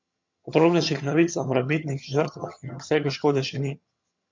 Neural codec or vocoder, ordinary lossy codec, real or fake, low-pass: vocoder, 22.05 kHz, 80 mel bands, HiFi-GAN; MP3, 48 kbps; fake; 7.2 kHz